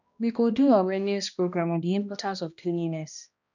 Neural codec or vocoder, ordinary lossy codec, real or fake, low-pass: codec, 16 kHz, 1 kbps, X-Codec, HuBERT features, trained on balanced general audio; none; fake; 7.2 kHz